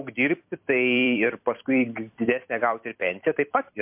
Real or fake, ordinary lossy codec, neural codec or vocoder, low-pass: fake; MP3, 32 kbps; vocoder, 44.1 kHz, 128 mel bands every 256 samples, BigVGAN v2; 3.6 kHz